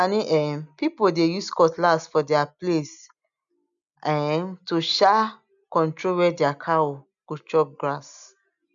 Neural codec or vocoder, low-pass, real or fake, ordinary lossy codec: none; 7.2 kHz; real; none